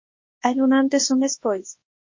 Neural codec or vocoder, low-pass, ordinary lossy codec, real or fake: codec, 24 kHz, 0.9 kbps, WavTokenizer, large speech release; 7.2 kHz; MP3, 32 kbps; fake